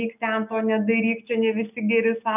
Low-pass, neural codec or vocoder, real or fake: 3.6 kHz; none; real